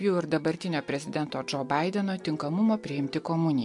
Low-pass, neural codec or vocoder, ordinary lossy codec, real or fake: 10.8 kHz; none; MP3, 64 kbps; real